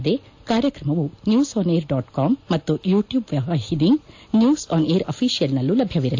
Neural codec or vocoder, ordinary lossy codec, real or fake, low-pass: none; AAC, 48 kbps; real; 7.2 kHz